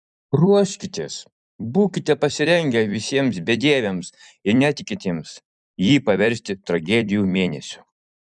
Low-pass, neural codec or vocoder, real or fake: 10.8 kHz; vocoder, 44.1 kHz, 128 mel bands every 256 samples, BigVGAN v2; fake